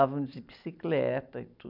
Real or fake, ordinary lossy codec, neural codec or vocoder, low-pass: real; none; none; 5.4 kHz